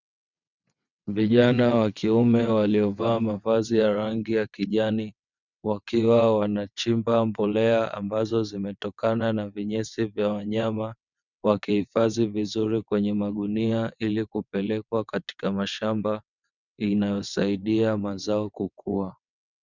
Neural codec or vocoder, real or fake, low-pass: vocoder, 22.05 kHz, 80 mel bands, WaveNeXt; fake; 7.2 kHz